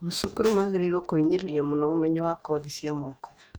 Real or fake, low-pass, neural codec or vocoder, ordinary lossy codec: fake; none; codec, 44.1 kHz, 2.6 kbps, DAC; none